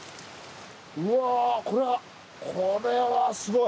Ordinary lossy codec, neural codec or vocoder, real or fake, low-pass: none; none; real; none